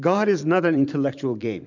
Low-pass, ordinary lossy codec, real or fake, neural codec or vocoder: 7.2 kHz; MP3, 64 kbps; fake; vocoder, 44.1 kHz, 128 mel bands every 512 samples, BigVGAN v2